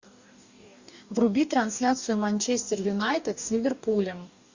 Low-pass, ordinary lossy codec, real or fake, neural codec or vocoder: 7.2 kHz; Opus, 64 kbps; fake; codec, 44.1 kHz, 2.6 kbps, DAC